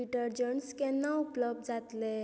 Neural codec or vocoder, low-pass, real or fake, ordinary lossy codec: none; none; real; none